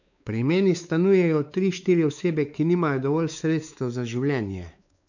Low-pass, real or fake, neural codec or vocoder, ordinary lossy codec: 7.2 kHz; fake; codec, 16 kHz, 4 kbps, X-Codec, WavLM features, trained on Multilingual LibriSpeech; none